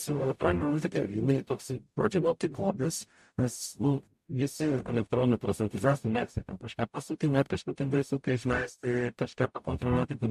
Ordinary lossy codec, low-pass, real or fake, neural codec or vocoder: MP3, 64 kbps; 14.4 kHz; fake; codec, 44.1 kHz, 0.9 kbps, DAC